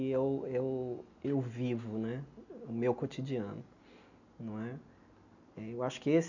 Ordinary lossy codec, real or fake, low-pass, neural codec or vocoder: none; real; 7.2 kHz; none